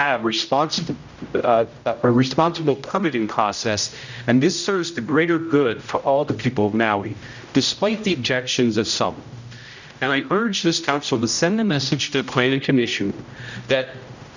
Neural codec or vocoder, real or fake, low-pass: codec, 16 kHz, 0.5 kbps, X-Codec, HuBERT features, trained on general audio; fake; 7.2 kHz